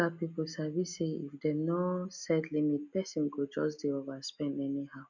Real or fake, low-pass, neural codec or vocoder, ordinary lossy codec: real; 7.2 kHz; none; none